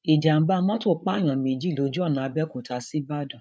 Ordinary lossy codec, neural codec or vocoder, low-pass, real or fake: none; codec, 16 kHz, 8 kbps, FreqCodec, larger model; none; fake